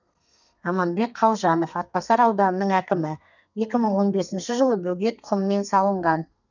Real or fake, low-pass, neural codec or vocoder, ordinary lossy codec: fake; 7.2 kHz; codec, 32 kHz, 1.9 kbps, SNAC; none